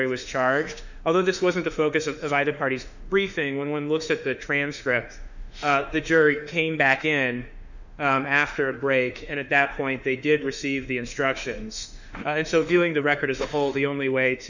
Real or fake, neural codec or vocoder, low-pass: fake; autoencoder, 48 kHz, 32 numbers a frame, DAC-VAE, trained on Japanese speech; 7.2 kHz